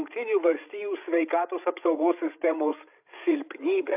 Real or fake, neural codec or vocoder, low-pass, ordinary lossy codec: fake; codec, 16 kHz, 16 kbps, FreqCodec, larger model; 3.6 kHz; AAC, 32 kbps